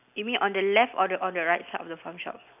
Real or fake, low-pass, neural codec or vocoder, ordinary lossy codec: real; 3.6 kHz; none; none